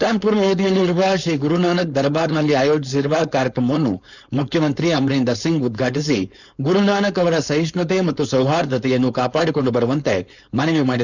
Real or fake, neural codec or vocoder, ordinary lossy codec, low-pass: fake; codec, 16 kHz, 4.8 kbps, FACodec; none; 7.2 kHz